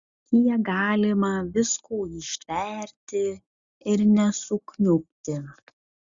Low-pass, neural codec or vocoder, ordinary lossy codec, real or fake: 7.2 kHz; none; Opus, 64 kbps; real